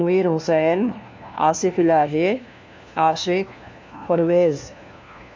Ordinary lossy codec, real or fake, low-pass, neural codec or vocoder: MP3, 48 kbps; fake; 7.2 kHz; codec, 16 kHz, 1 kbps, FunCodec, trained on LibriTTS, 50 frames a second